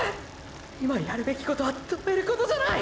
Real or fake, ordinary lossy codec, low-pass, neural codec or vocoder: real; none; none; none